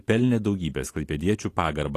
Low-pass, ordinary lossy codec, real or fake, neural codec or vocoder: 14.4 kHz; AAC, 48 kbps; real; none